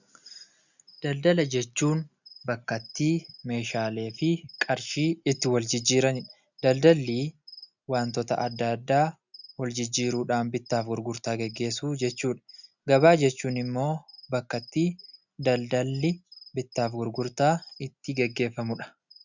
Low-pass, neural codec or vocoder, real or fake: 7.2 kHz; none; real